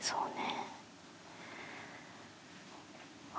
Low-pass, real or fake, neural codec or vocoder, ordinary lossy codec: none; real; none; none